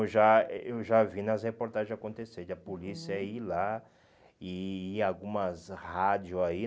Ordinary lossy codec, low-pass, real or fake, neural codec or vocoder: none; none; real; none